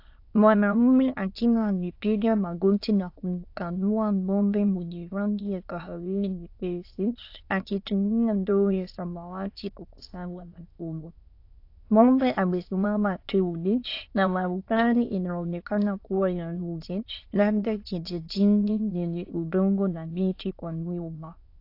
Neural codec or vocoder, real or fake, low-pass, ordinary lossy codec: autoencoder, 22.05 kHz, a latent of 192 numbers a frame, VITS, trained on many speakers; fake; 5.4 kHz; AAC, 32 kbps